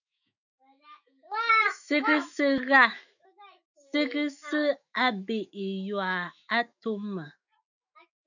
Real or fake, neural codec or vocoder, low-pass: fake; autoencoder, 48 kHz, 128 numbers a frame, DAC-VAE, trained on Japanese speech; 7.2 kHz